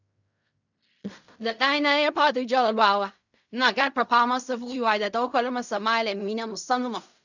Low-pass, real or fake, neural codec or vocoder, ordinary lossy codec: 7.2 kHz; fake; codec, 16 kHz in and 24 kHz out, 0.4 kbps, LongCat-Audio-Codec, fine tuned four codebook decoder; none